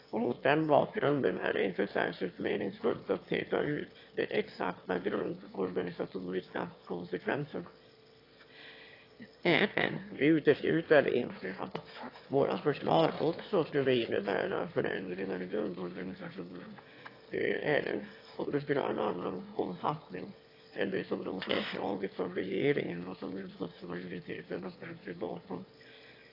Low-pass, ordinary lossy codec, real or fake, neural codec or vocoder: 5.4 kHz; AAC, 32 kbps; fake; autoencoder, 22.05 kHz, a latent of 192 numbers a frame, VITS, trained on one speaker